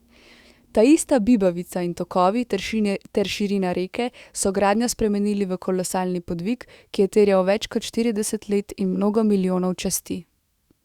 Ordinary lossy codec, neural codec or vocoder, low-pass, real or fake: Opus, 64 kbps; autoencoder, 48 kHz, 128 numbers a frame, DAC-VAE, trained on Japanese speech; 19.8 kHz; fake